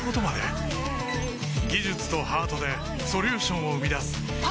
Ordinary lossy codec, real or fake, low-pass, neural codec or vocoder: none; real; none; none